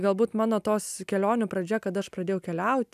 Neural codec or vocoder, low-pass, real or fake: none; 14.4 kHz; real